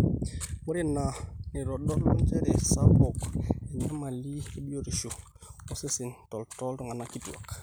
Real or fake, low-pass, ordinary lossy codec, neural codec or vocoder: real; none; none; none